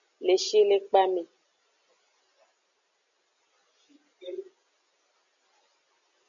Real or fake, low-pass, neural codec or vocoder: real; 7.2 kHz; none